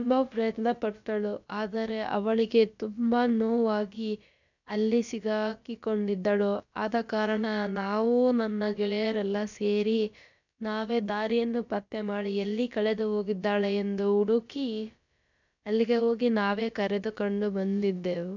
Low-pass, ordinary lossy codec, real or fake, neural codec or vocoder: 7.2 kHz; none; fake; codec, 16 kHz, about 1 kbps, DyCAST, with the encoder's durations